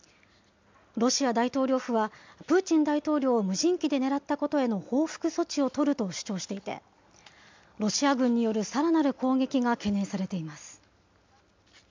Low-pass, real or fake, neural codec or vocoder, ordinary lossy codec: 7.2 kHz; real; none; MP3, 64 kbps